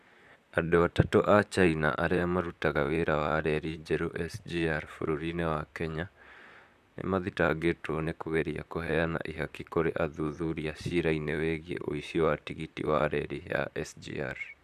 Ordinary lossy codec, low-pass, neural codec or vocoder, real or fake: none; 14.4 kHz; vocoder, 44.1 kHz, 128 mel bands, Pupu-Vocoder; fake